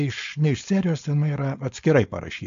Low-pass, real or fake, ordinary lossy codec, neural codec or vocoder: 7.2 kHz; fake; AAC, 64 kbps; codec, 16 kHz, 4.8 kbps, FACodec